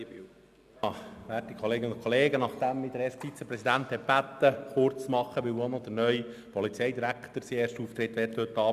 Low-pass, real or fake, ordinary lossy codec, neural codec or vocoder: 14.4 kHz; real; Opus, 64 kbps; none